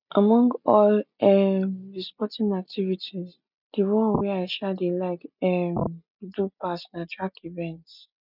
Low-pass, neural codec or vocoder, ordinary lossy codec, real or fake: 5.4 kHz; none; none; real